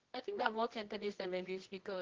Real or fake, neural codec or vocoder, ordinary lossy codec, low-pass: fake; codec, 24 kHz, 0.9 kbps, WavTokenizer, medium music audio release; Opus, 16 kbps; 7.2 kHz